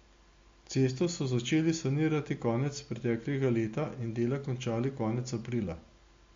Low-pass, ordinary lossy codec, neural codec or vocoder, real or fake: 7.2 kHz; MP3, 48 kbps; none; real